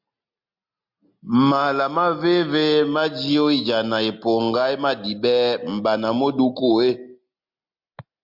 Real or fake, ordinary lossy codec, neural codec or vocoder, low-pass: real; MP3, 48 kbps; none; 5.4 kHz